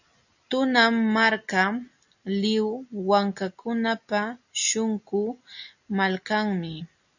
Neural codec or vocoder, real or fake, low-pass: none; real; 7.2 kHz